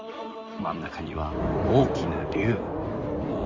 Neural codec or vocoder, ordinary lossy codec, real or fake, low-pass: codec, 16 kHz in and 24 kHz out, 2.2 kbps, FireRedTTS-2 codec; Opus, 32 kbps; fake; 7.2 kHz